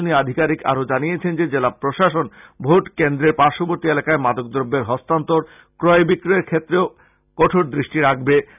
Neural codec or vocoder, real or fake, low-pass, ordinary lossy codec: none; real; 3.6 kHz; none